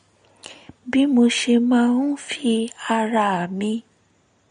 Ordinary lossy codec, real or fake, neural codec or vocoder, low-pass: MP3, 48 kbps; real; none; 9.9 kHz